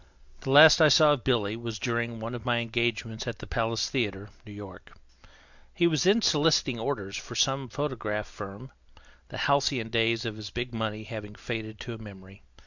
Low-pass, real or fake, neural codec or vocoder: 7.2 kHz; real; none